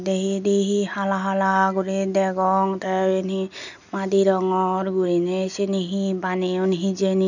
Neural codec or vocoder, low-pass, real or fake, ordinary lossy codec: none; 7.2 kHz; real; none